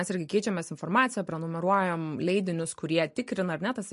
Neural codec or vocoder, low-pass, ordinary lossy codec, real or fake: none; 14.4 kHz; MP3, 48 kbps; real